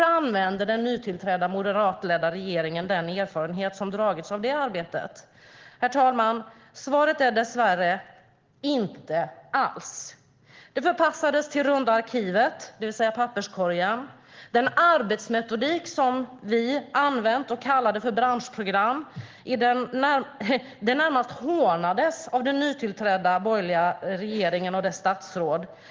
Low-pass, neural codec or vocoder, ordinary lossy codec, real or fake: 7.2 kHz; none; Opus, 16 kbps; real